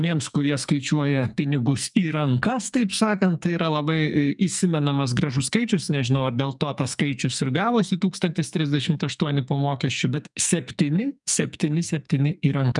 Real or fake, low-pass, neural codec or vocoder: fake; 10.8 kHz; codec, 44.1 kHz, 2.6 kbps, SNAC